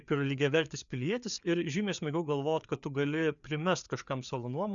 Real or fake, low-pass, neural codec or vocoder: fake; 7.2 kHz; codec, 16 kHz, 4 kbps, FreqCodec, larger model